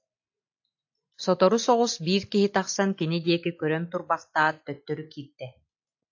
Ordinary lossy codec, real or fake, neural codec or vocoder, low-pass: AAC, 48 kbps; real; none; 7.2 kHz